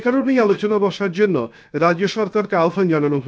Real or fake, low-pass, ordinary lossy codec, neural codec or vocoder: fake; none; none; codec, 16 kHz, 0.7 kbps, FocalCodec